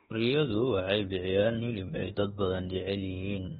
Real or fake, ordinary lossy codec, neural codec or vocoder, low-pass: fake; AAC, 16 kbps; autoencoder, 48 kHz, 32 numbers a frame, DAC-VAE, trained on Japanese speech; 19.8 kHz